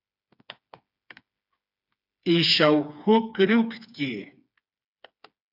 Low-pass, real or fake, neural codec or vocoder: 5.4 kHz; fake; codec, 16 kHz, 4 kbps, FreqCodec, smaller model